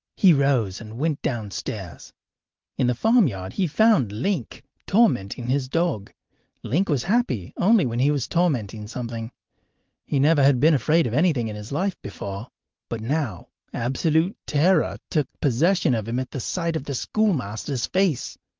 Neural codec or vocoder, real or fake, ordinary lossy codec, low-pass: none; real; Opus, 32 kbps; 7.2 kHz